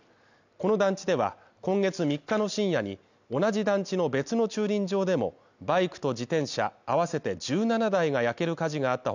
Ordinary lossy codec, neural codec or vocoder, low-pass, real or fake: none; none; 7.2 kHz; real